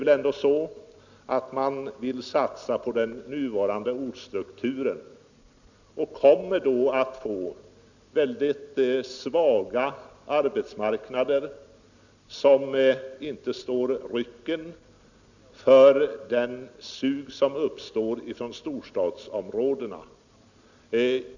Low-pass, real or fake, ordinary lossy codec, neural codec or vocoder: 7.2 kHz; real; none; none